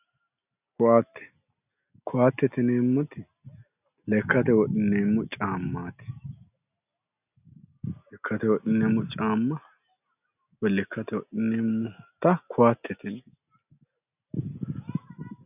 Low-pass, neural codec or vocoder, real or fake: 3.6 kHz; none; real